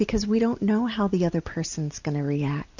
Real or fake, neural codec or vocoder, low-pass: real; none; 7.2 kHz